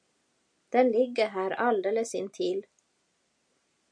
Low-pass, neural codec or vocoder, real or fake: 9.9 kHz; none; real